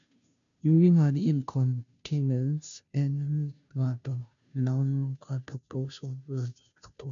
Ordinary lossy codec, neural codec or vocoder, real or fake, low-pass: none; codec, 16 kHz, 0.5 kbps, FunCodec, trained on Chinese and English, 25 frames a second; fake; 7.2 kHz